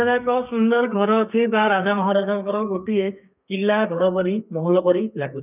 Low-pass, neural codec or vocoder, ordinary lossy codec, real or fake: 3.6 kHz; codec, 32 kHz, 1.9 kbps, SNAC; none; fake